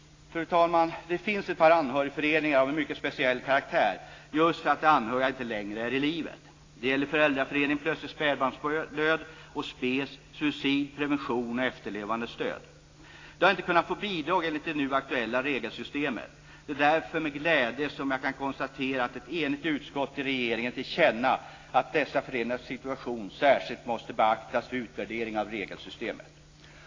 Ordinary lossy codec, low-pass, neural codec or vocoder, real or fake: AAC, 32 kbps; 7.2 kHz; none; real